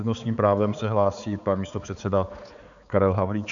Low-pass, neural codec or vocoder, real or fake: 7.2 kHz; codec, 16 kHz, 4 kbps, X-Codec, HuBERT features, trained on balanced general audio; fake